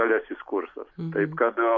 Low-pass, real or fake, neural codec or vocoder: 7.2 kHz; real; none